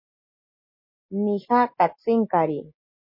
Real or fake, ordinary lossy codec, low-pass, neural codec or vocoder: real; MP3, 24 kbps; 5.4 kHz; none